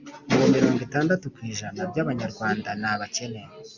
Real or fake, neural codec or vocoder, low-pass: real; none; 7.2 kHz